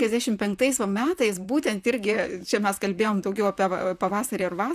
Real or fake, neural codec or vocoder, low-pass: fake; vocoder, 44.1 kHz, 128 mel bands, Pupu-Vocoder; 14.4 kHz